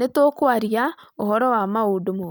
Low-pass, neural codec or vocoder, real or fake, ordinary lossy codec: none; none; real; none